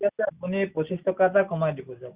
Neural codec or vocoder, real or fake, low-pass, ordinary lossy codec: none; real; 3.6 kHz; Opus, 64 kbps